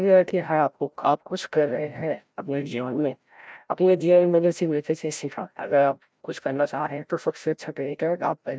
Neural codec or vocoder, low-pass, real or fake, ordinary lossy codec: codec, 16 kHz, 0.5 kbps, FreqCodec, larger model; none; fake; none